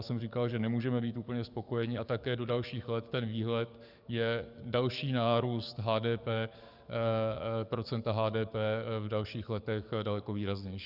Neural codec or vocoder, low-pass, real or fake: codec, 44.1 kHz, 7.8 kbps, DAC; 5.4 kHz; fake